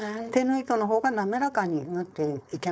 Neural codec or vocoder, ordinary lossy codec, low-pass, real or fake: codec, 16 kHz, 4.8 kbps, FACodec; none; none; fake